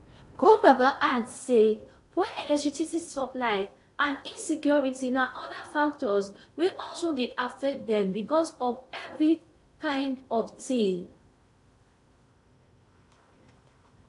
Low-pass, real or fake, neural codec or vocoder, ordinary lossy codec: 10.8 kHz; fake; codec, 16 kHz in and 24 kHz out, 0.6 kbps, FocalCodec, streaming, 4096 codes; AAC, 48 kbps